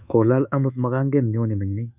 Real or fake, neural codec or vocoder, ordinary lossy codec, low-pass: fake; codec, 24 kHz, 1.2 kbps, DualCodec; none; 3.6 kHz